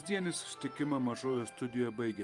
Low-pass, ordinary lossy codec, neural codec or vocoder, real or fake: 10.8 kHz; Opus, 32 kbps; vocoder, 44.1 kHz, 128 mel bands every 512 samples, BigVGAN v2; fake